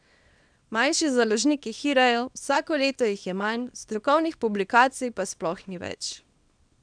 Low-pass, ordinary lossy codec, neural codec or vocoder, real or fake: 9.9 kHz; none; codec, 24 kHz, 0.9 kbps, WavTokenizer, small release; fake